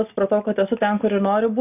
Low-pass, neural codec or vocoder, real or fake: 3.6 kHz; none; real